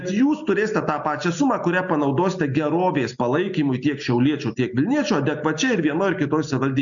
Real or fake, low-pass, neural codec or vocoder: real; 7.2 kHz; none